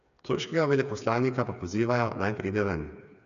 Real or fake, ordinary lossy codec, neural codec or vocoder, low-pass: fake; none; codec, 16 kHz, 4 kbps, FreqCodec, smaller model; 7.2 kHz